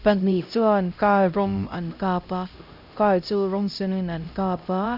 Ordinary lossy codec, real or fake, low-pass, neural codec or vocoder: AAC, 48 kbps; fake; 5.4 kHz; codec, 16 kHz, 0.5 kbps, X-Codec, HuBERT features, trained on LibriSpeech